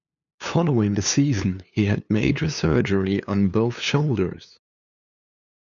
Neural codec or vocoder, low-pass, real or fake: codec, 16 kHz, 2 kbps, FunCodec, trained on LibriTTS, 25 frames a second; 7.2 kHz; fake